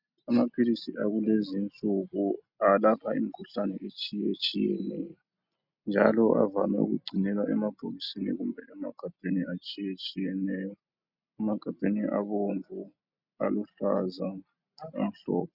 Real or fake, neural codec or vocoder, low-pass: real; none; 5.4 kHz